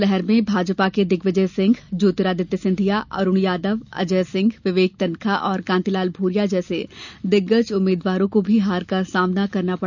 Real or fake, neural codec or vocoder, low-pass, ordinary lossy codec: real; none; 7.2 kHz; none